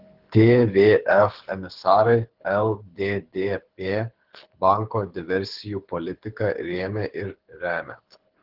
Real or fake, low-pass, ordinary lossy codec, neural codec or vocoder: fake; 5.4 kHz; Opus, 16 kbps; codec, 24 kHz, 6 kbps, HILCodec